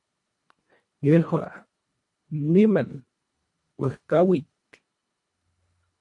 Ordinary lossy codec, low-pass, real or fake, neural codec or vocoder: MP3, 48 kbps; 10.8 kHz; fake; codec, 24 kHz, 1.5 kbps, HILCodec